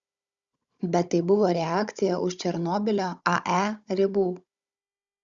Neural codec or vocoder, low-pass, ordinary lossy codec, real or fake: codec, 16 kHz, 16 kbps, FunCodec, trained on Chinese and English, 50 frames a second; 7.2 kHz; Opus, 64 kbps; fake